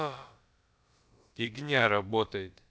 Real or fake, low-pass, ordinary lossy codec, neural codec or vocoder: fake; none; none; codec, 16 kHz, about 1 kbps, DyCAST, with the encoder's durations